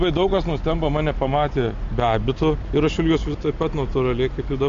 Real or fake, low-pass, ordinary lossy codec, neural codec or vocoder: real; 7.2 kHz; MP3, 48 kbps; none